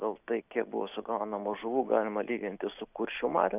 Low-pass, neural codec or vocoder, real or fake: 3.6 kHz; none; real